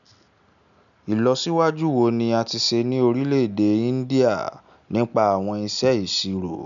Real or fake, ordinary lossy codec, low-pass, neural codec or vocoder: real; none; 7.2 kHz; none